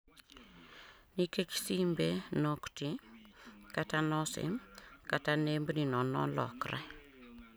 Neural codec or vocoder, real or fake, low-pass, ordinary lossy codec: none; real; none; none